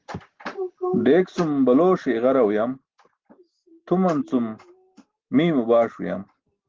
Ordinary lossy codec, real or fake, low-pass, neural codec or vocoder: Opus, 16 kbps; real; 7.2 kHz; none